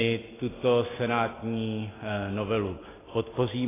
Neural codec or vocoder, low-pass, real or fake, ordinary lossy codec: none; 3.6 kHz; real; AAC, 16 kbps